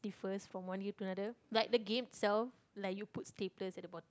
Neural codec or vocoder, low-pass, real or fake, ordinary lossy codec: none; none; real; none